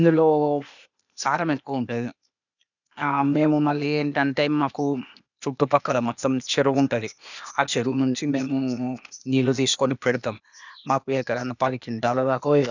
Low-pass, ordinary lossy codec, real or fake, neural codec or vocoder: 7.2 kHz; none; fake; codec, 16 kHz, 0.8 kbps, ZipCodec